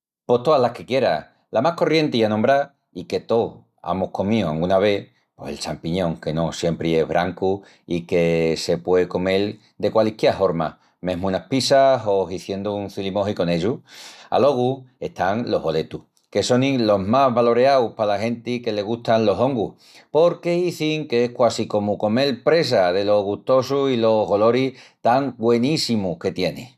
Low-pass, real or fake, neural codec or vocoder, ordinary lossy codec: 14.4 kHz; real; none; none